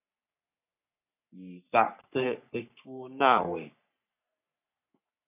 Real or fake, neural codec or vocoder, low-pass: fake; codec, 44.1 kHz, 3.4 kbps, Pupu-Codec; 3.6 kHz